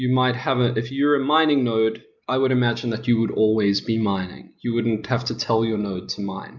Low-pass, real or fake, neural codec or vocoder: 7.2 kHz; real; none